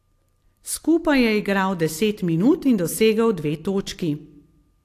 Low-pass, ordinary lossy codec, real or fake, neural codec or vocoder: 14.4 kHz; AAC, 64 kbps; real; none